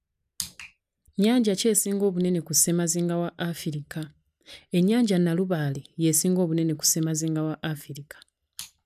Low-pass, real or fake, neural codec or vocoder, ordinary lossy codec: 14.4 kHz; real; none; none